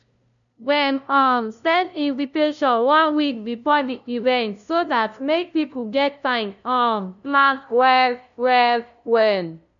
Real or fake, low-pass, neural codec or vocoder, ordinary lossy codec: fake; 7.2 kHz; codec, 16 kHz, 0.5 kbps, FunCodec, trained on LibriTTS, 25 frames a second; none